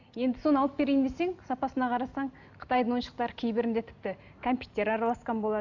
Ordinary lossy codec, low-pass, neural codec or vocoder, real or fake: none; 7.2 kHz; none; real